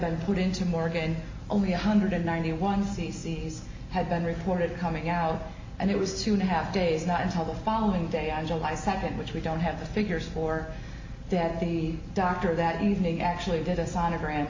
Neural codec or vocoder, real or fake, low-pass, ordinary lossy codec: none; real; 7.2 kHz; MP3, 48 kbps